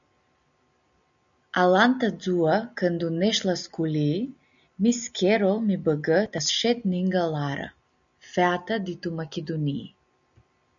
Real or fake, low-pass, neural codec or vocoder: real; 7.2 kHz; none